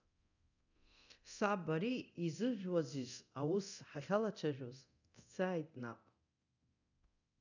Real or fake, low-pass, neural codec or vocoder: fake; 7.2 kHz; codec, 24 kHz, 0.9 kbps, DualCodec